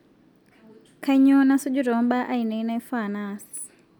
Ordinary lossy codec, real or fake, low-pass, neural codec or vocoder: none; real; 19.8 kHz; none